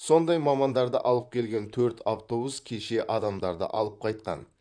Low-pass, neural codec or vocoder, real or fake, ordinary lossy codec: 9.9 kHz; vocoder, 44.1 kHz, 128 mel bands, Pupu-Vocoder; fake; none